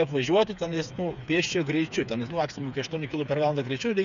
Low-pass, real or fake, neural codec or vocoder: 7.2 kHz; fake; codec, 16 kHz, 4 kbps, FreqCodec, smaller model